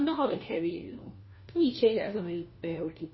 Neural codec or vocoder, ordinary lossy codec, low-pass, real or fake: codec, 16 kHz, 1 kbps, FunCodec, trained on LibriTTS, 50 frames a second; MP3, 24 kbps; 7.2 kHz; fake